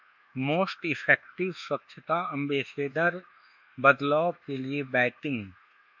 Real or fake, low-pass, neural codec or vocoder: fake; 7.2 kHz; codec, 24 kHz, 1.2 kbps, DualCodec